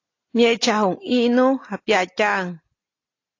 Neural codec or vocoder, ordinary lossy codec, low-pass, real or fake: none; AAC, 32 kbps; 7.2 kHz; real